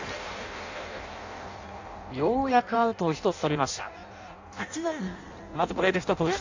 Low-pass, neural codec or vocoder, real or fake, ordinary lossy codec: 7.2 kHz; codec, 16 kHz in and 24 kHz out, 0.6 kbps, FireRedTTS-2 codec; fake; AAC, 48 kbps